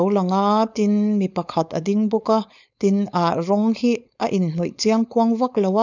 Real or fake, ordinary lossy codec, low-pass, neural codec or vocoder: fake; none; 7.2 kHz; codec, 16 kHz, 4.8 kbps, FACodec